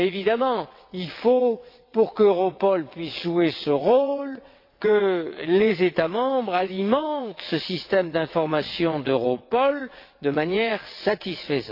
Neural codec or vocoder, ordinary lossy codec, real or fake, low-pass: vocoder, 22.05 kHz, 80 mel bands, WaveNeXt; MP3, 32 kbps; fake; 5.4 kHz